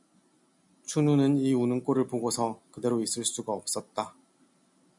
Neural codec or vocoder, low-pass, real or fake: none; 10.8 kHz; real